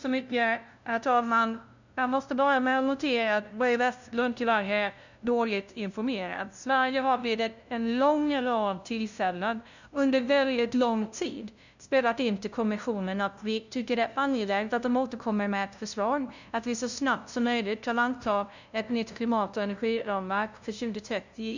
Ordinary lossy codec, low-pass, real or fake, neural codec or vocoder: none; 7.2 kHz; fake; codec, 16 kHz, 0.5 kbps, FunCodec, trained on LibriTTS, 25 frames a second